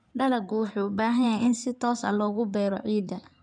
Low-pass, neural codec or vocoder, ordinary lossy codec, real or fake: 9.9 kHz; codec, 16 kHz in and 24 kHz out, 2.2 kbps, FireRedTTS-2 codec; none; fake